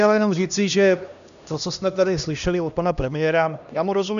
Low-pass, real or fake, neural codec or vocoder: 7.2 kHz; fake; codec, 16 kHz, 1 kbps, X-Codec, HuBERT features, trained on LibriSpeech